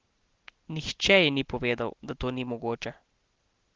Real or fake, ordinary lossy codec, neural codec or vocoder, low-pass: real; Opus, 16 kbps; none; 7.2 kHz